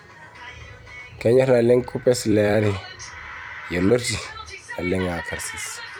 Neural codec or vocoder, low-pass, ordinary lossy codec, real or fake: vocoder, 44.1 kHz, 128 mel bands every 256 samples, BigVGAN v2; none; none; fake